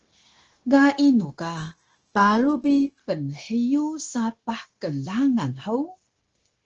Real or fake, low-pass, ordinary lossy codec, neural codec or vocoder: fake; 7.2 kHz; Opus, 16 kbps; codec, 16 kHz, 0.9 kbps, LongCat-Audio-Codec